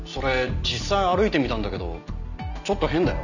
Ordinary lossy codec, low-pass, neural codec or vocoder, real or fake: none; 7.2 kHz; none; real